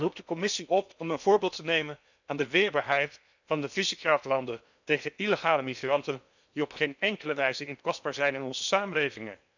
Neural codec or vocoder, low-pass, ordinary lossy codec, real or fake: codec, 16 kHz in and 24 kHz out, 0.8 kbps, FocalCodec, streaming, 65536 codes; 7.2 kHz; none; fake